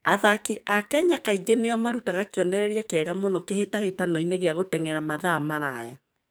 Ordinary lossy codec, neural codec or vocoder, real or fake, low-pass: none; codec, 44.1 kHz, 2.6 kbps, SNAC; fake; none